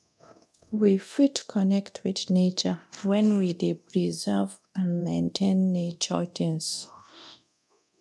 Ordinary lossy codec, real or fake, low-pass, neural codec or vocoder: none; fake; none; codec, 24 kHz, 0.9 kbps, DualCodec